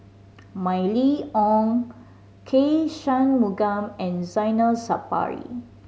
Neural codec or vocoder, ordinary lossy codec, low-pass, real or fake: none; none; none; real